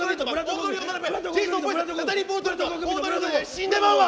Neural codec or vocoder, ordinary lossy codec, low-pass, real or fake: none; none; none; real